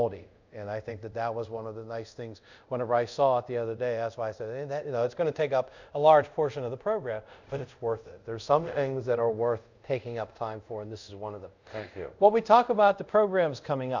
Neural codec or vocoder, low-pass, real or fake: codec, 24 kHz, 0.5 kbps, DualCodec; 7.2 kHz; fake